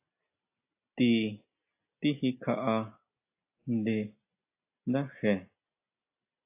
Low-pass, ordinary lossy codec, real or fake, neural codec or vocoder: 3.6 kHz; AAC, 32 kbps; real; none